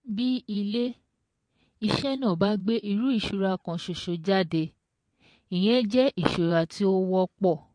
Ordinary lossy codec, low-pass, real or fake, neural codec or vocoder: MP3, 48 kbps; 9.9 kHz; fake; vocoder, 22.05 kHz, 80 mel bands, WaveNeXt